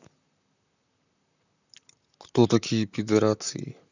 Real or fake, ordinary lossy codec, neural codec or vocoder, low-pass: fake; none; vocoder, 44.1 kHz, 128 mel bands, Pupu-Vocoder; 7.2 kHz